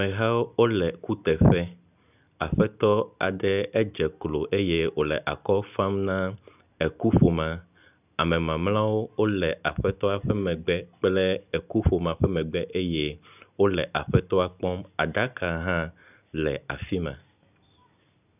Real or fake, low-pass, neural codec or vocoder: real; 3.6 kHz; none